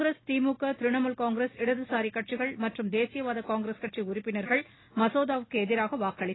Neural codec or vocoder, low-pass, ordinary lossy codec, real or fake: none; 7.2 kHz; AAC, 16 kbps; real